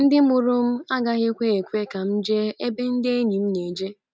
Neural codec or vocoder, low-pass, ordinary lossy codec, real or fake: none; none; none; real